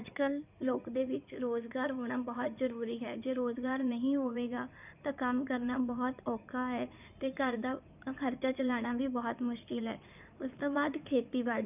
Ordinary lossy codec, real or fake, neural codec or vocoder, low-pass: none; fake; codec, 16 kHz in and 24 kHz out, 2.2 kbps, FireRedTTS-2 codec; 3.6 kHz